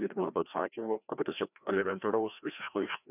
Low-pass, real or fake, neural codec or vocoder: 3.6 kHz; fake; codec, 16 kHz, 1 kbps, FreqCodec, larger model